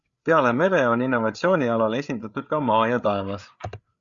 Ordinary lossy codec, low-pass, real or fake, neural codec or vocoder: Opus, 64 kbps; 7.2 kHz; fake; codec, 16 kHz, 8 kbps, FreqCodec, larger model